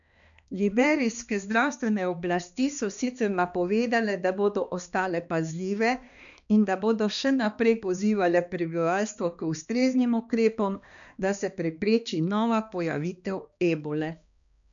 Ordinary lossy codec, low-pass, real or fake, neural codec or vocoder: none; 7.2 kHz; fake; codec, 16 kHz, 2 kbps, X-Codec, HuBERT features, trained on balanced general audio